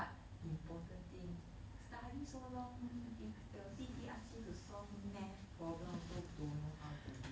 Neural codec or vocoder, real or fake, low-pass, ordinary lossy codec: none; real; none; none